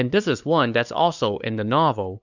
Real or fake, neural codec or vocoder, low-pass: fake; codec, 16 kHz, 2 kbps, FunCodec, trained on LibriTTS, 25 frames a second; 7.2 kHz